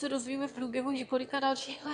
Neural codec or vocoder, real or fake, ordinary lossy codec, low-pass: autoencoder, 22.05 kHz, a latent of 192 numbers a frame, VITS, trained on one speaker; fake; AAC, 64 kbps; 9.9 kHz